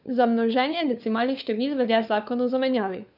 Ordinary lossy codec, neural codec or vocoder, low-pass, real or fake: none; codec, 16 kHz, 4 kbps, FunCodec, trained on LibriTTS, 50 frames a second; 5.4 kHz; fake